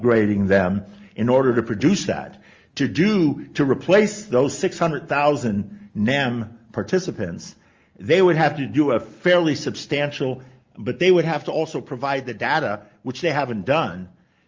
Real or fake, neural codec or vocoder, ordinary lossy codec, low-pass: real; none; Opus, 32 kbps; 7.2 kHz